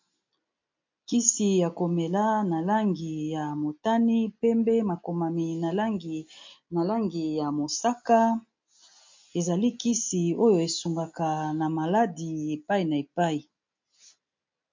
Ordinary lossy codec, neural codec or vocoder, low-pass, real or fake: MP3, 48 kbps; none; 7.2 kHz; real